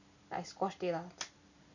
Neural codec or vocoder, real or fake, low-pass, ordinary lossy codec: none; real; 7.2 kHz; none